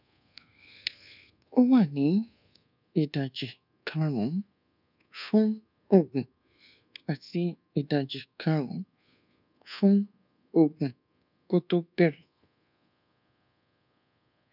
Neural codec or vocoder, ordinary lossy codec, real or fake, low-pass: codec, 24 kHz, 1.2 kbps, DualCodec; none; fake; 5.4 kHz